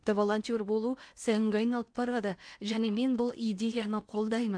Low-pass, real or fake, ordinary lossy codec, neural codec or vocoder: 9.9 kHz; fake; AAC, 64 kbps; codec, 16 kHz in and 24 kHz out, 0.8 kbps, FocalCodec, streaming, 65536 codes